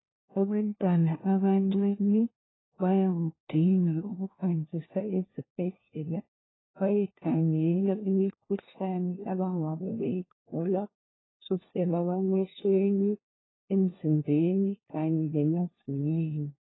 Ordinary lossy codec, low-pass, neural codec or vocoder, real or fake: AAC, 16 kbps; 7.2 kHz; codec, 16 kHz, 1 kbps, FunCodec, trained on LibriTTS, 50 frames a second; fake